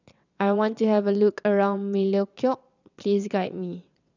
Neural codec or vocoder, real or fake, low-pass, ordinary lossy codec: vocoder, 22.05 kHz, 80 mel bands, WaveNeXt; fake; 7.2 kHz; none